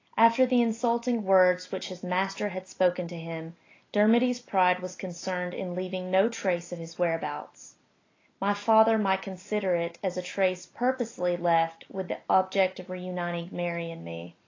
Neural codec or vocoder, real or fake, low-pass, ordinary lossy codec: none; real; 7.2 kHz; AAC, 32 kbps